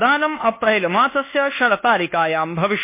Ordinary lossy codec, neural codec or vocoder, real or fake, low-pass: MP3, 24 kbps; codec, 24 kHz, 1.2 kbps, DualCodec; fake; 3.6 kHz